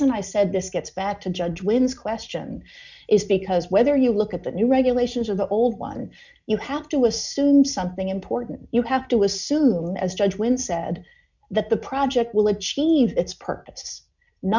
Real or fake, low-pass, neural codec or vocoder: real; 7.2 kHz; none